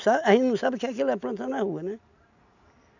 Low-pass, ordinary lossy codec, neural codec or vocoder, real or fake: 7.2 kHz; none; none; real